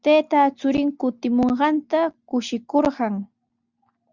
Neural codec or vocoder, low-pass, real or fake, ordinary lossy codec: none; 7.2 kHz; real; Opus, 64 kbps